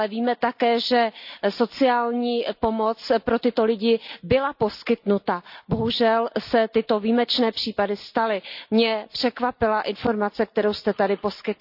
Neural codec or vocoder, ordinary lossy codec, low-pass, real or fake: none; none; 5.4 kHz; real